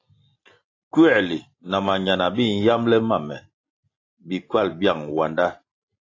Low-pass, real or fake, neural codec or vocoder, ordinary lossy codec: 7.2 kHz; real; none; AAC, 32 kbps